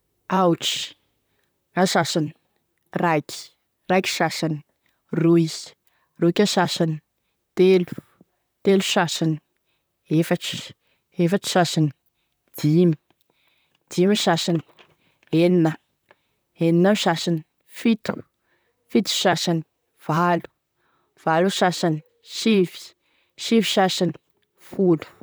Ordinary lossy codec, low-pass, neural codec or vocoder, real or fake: none; none; vocoder, 44.1 kHz, 128 mel bands, Pupu-Vocoder; fake